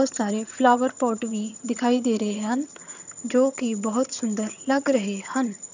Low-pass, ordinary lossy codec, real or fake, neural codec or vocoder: 7.2 kHz; none; fake; vocoder, 22.05 kHz, 80 mel bands, HiFi-GAN